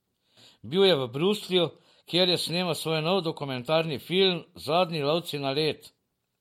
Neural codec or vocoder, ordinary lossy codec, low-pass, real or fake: none; MP3, 64 kbps; 19.8 kHz; real